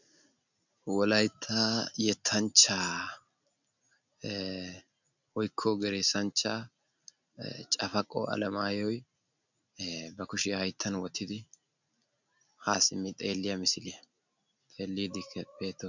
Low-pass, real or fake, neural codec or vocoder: 7.2 kHz; real; none